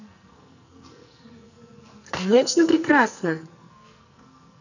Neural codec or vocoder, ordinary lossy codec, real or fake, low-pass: codec, 44.1 kHz, 2.6 kbps, SNAC; none; fake; 7.2 kHz